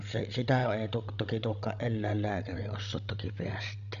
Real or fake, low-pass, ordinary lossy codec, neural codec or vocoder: fake; 7.2 kHz; none; codec, 16 kHz, 16 kbps, FreqCodec, larger model